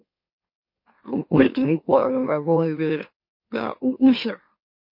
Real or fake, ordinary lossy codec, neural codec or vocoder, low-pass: fake; MP3, 32 kbps; autoencoder, 44.1 kHz, a latent of 192 numbers a frame, MeloTTS; 5.4 kHz